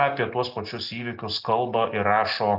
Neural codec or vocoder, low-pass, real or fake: none; 5.4 kHz; real